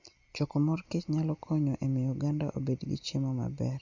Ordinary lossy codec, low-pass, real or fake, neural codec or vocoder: Opus, 64 kbps; 7.2 kHz; real; none